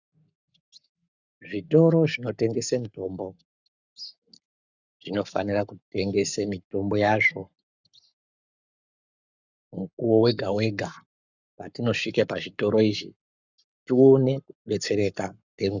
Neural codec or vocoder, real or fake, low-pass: codec, 44.1 kHz, 7.8 kbps, Pupu-Codec; fake; 7.2 kHz